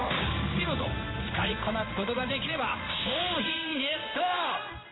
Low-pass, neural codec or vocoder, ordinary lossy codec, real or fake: 7.2 kHz; codec, 16 kHz in and 24 kHz out, 1 kbps, XY-Tokenizer; AAC, 16 kbps; fake